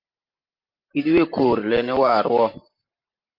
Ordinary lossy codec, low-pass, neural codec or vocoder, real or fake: Opus, 24 kbps; 5.4 kHz; vocoder, 44.1 kHz, 128 mel bands every 512 samples, BigVGAN v2; fake